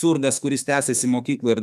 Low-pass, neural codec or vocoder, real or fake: 14.4 kHz; autoencoder, 48 kHz, 32 numbers a frame, DAC-VAE, trained on Japanese speech; fake